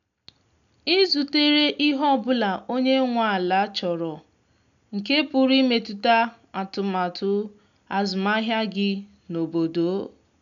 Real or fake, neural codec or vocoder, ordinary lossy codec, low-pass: real; none; none; 7.2 kHz